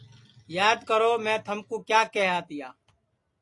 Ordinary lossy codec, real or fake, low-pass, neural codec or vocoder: AAC, 48 kbps; real; 10.8 kHz; none